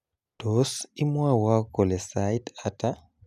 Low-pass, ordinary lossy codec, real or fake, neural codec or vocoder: 14.4 kHz; none; real; none